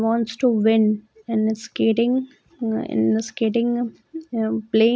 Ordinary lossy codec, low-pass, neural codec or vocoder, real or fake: none; none; none; real